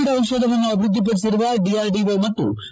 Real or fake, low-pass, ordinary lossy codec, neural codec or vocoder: fake; none; none; codec, 16 kHz, 16 kbps, FreqCodec, larger model